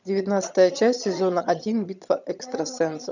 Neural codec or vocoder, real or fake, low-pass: vocoder, 22.05 kHz, 80 mel bands, HiFi-GAN; fake; 7.2 kHz